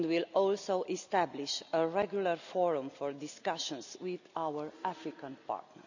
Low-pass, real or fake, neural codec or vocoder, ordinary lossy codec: 7.2 kHz; real; none; none